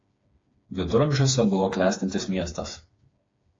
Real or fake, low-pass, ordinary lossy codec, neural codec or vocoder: fake; 7.2 kHz; AAC, 32 kbps; codec, 16 kHz, 4 kbps, FreqCodec, smaller model